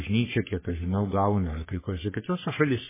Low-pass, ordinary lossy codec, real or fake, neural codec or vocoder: 3.6 kHz; MP3, 16 kbps; fake; codec, 44.1 kHz, 3.4 kbps, Pupu-Codec